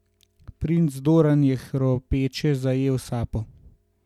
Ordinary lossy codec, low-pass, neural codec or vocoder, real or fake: none; 19.8 kHz; none; real